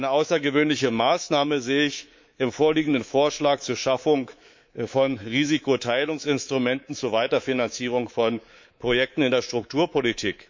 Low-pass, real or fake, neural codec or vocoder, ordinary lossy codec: 7.2 kHz; fake; codec, 24 kHz, 3.1 kbps, DualCodec; MP3, 48 kbps